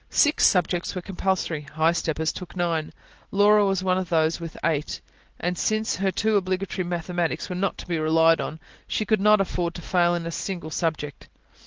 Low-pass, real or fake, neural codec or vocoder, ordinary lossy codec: 7.2 kHz; real; none; Opus, 16 kbps